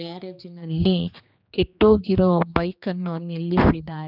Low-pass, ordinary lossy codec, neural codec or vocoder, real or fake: 5.4 kHz; none; codec, 16 kHz, 2 kbps, X-Codec, HuBERT features, trained on general audio; fake